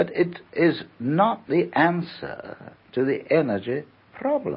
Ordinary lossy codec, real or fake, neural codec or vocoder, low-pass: MP3, 24 kbps; real; none; 7.2 kHz